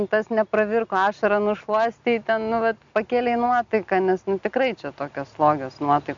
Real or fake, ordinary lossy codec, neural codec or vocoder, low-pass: real; MP3, 64 kbps; none; 7.2 kHz